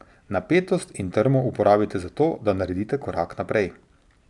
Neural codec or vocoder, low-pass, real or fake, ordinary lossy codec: none; 10.8 kHz; real; none